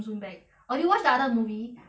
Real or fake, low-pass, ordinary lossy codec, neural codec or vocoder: real; none; none; none